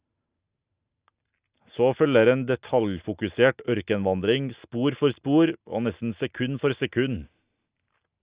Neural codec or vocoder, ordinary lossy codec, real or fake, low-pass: none; Opus, 64 kbps; real; 3.6 kHz